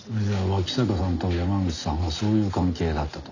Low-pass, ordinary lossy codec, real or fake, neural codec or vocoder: 7.2 kHz; none; real; none